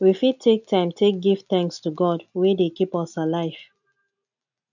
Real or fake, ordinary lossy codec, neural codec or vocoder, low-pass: real; none; none; 7.2 kHz